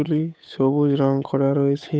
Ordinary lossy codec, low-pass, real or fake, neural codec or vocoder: none; none; fake; codec, 16 kHz, 8 kbps, FunCodec, trained on Chinese and English, 25 frames a second